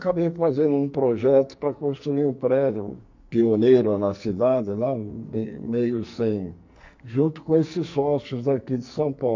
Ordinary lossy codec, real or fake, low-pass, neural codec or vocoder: MP3, 48 kbps; fake; 7.2 kHz; codec, 44.1 kHz, 2.6 kbps, SNAC